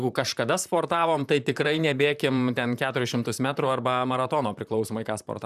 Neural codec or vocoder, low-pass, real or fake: vocoder, 44.1 kHz, 128 mel bands, Pupu-Vocoder; 14.4 kHz; fake